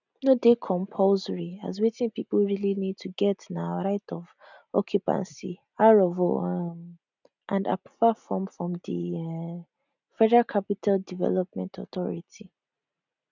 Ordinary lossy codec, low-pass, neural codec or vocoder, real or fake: none; 7.2 kHz; none; real